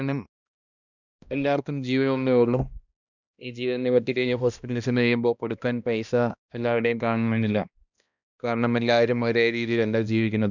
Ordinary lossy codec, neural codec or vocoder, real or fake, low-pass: none; codec, 16 kHz, 1 kbps, X-Codec, HuBERT features, trained on balanced general audio; fake; 7.2 kHz